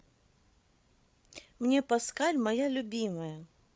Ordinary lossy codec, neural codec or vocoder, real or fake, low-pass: none; codec, 16 kHz, 16 kbps, FreqCodec, larger model; fake; none